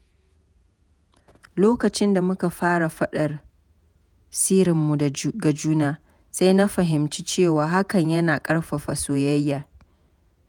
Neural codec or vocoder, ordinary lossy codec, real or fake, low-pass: vocoder, 48 kHz, 128 mel bands, Vocos; none; fake; none